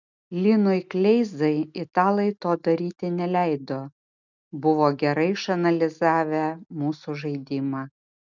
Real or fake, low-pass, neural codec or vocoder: real; 7.2 kHz; none